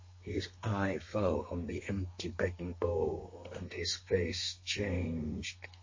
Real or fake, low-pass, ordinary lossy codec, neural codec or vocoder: fake; 7.2 kHz; MP3, 32 kbps; codec, 32 kHz, 1.9 kbps, SNAC